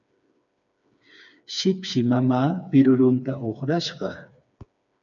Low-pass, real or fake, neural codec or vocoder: 7.2 kHz; fake; codec, 16 kHz, 4 kbps, FreqCodec, smaller model